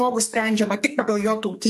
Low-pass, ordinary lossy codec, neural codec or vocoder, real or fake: 14.4 kHz; MP3, 64 kbps; codec, 44.1 kHz, 2.6 kbps, SNAC; fake